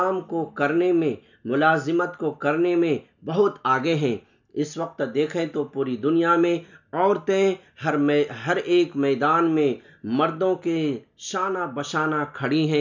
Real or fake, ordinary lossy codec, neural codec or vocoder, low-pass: real; none; none; 7.2 kHz